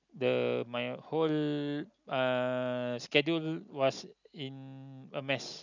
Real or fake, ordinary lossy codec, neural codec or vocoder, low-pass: real; none; none; 7.2 kHz